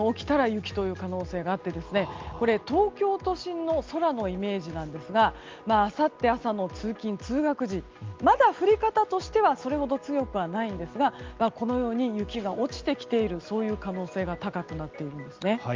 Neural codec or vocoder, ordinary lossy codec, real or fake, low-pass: none; Opus, 32 kbps; real; 7.2 kHz